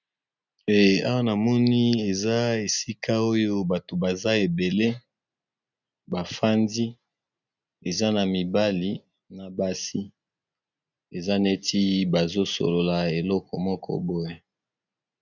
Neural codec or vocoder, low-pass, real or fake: none; 7.2 kHz; real